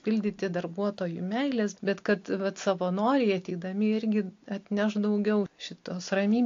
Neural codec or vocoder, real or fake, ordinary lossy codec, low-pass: none; real; AAC, 48 kbps; 7.2 kHz